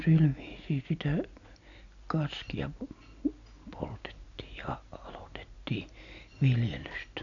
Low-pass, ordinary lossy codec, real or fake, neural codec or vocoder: 7.2 kHz; none; real; none